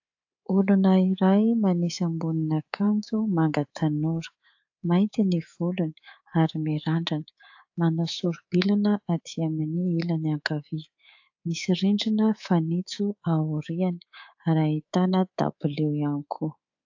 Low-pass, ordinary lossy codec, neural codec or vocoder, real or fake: 7.2 kHz; AAC, 48 kbps; codec, 24 kHz, 3.1 kbps, DualCodec; fake